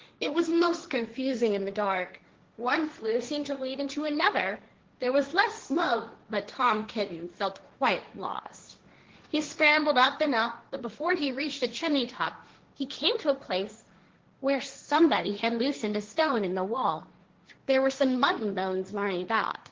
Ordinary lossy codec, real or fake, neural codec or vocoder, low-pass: Opus, 16 kbps; fake; codec, 16 kHz, 1.1 kbps, Voila-Tokenizer; 7.2 kHz